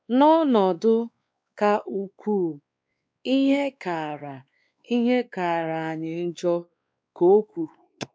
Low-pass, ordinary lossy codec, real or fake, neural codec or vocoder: none; none; fake; codec, 16 kHz, 2 kbps, X-Codec, WavLM features, trained on Multilingual LibriSpeech